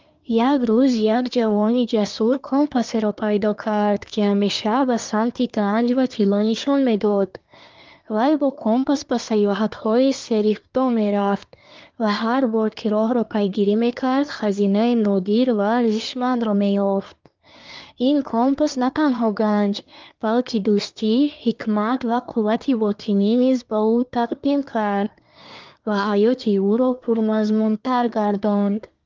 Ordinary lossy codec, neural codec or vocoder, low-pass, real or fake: Opus, 32 kbps; codec, 24 kHz, 1 kbps, SNAC; 7.2 kHz; fake